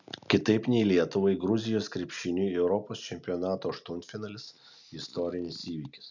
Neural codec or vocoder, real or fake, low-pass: none; real; 7.2 kHz